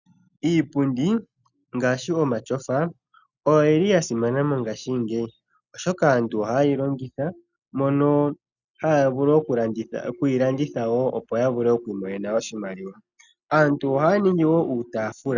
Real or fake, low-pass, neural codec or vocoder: real; 7.2 kHz; none